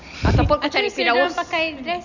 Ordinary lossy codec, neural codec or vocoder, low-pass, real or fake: none; none; 7.2 kHz; real